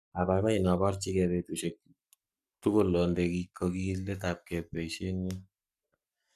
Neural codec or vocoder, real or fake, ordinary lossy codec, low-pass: codec, 44.1 kHz, 7.8 kbps, Pupu-Codec; fake; none; 14.4 kHz